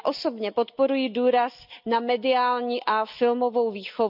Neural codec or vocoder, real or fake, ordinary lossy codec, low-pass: none; real; none; 5.4 kHz